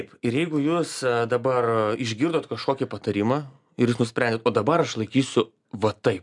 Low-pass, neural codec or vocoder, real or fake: 10.8 kHz; none; real